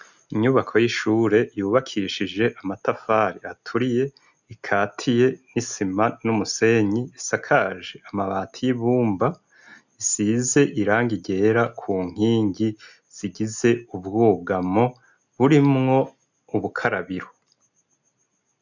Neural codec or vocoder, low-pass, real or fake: none; 7.2 kHz; real